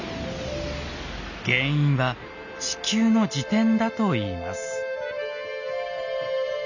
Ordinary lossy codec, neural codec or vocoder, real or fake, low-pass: none; none; real; 7.2 kHz